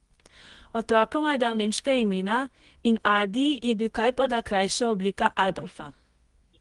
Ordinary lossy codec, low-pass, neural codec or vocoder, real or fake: Opus, 24 kbps; 10.8 kHz; codec, 24 kHz, 0.9 kbps, WavTokenizer, medium music audio release; fake